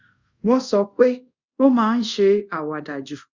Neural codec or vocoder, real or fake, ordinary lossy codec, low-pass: codec, 24 kHz, 0.5 kbps, DualCodec; fake; Opus, 64 kbps; 7.2 kHz